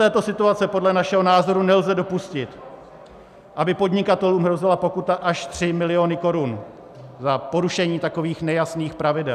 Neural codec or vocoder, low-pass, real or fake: none; 14.4 kHz; real